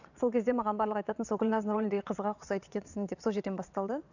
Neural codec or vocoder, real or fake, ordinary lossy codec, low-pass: vocoder, 22.05 kHz, 80 mel bands, WaveNeXt; fake; none; 7.2 kHz